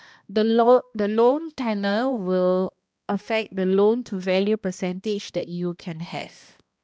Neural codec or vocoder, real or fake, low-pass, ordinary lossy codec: codec, 16 kHz, 1 kbps, X-Codec, HuBERT features, trained on balanced general audio; fake; none; none